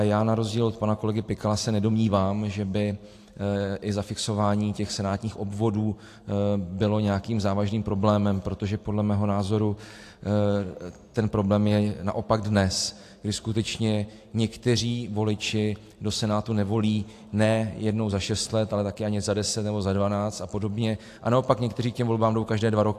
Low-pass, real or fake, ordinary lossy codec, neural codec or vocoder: 14.4 kHz; real; AAC, 64 kbps; none